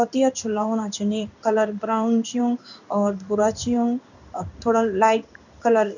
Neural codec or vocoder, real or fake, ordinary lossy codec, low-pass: codec, 16 kHz in and 24 kHz out, 1 kbps, XY-Tokenizer; fake; none; 7.2 kHz